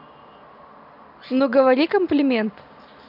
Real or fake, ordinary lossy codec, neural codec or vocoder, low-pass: real; none; none; 5.4 kHz